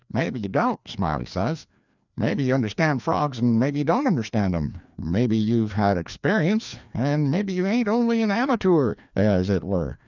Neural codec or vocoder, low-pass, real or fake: codec, 16 kHz, 2 kbps, FreqCodec, larger model; 7.2 kHz; fake